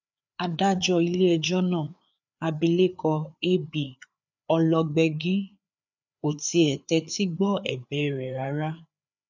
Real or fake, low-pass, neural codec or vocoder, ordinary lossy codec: fake; 7.2 kHz; codec, 16 kHz, 4 kbps, FreqCodec, larger model; none